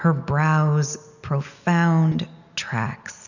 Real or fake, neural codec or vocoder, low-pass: real; none; 7.2 kHz